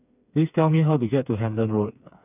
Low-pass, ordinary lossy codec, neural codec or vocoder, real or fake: 3.6 kHz; none; codec, 16 kHz, 4 kbps, FreqCodec, smaller model; fake